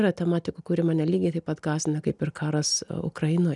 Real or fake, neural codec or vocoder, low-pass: real; none; 10.8 kHz